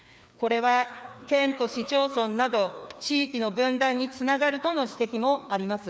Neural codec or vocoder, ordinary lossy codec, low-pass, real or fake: codec, 16 kHz, 2 kbps, FreqCodec, larger model; none; none; fake